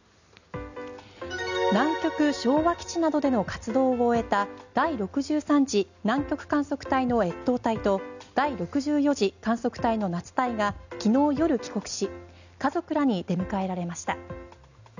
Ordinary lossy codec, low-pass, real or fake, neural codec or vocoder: none; 7.2 kHz; real; none